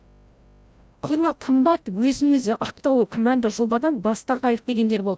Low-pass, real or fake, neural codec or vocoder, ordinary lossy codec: none; fake; codec, 16 kHz, 0.5 kbps, FreqCodec, larger model; none